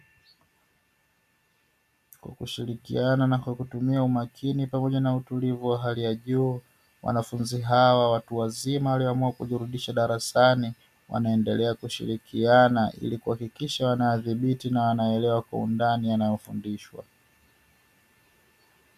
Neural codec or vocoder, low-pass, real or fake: none; 14.4 kHz; real